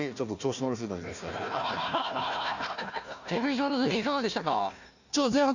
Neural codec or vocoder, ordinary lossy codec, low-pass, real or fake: codec, 16 kHz, 1 kbps, FunCodec, trained on Chinese and English, 50 frames a second; none; 7.2 kHz; fake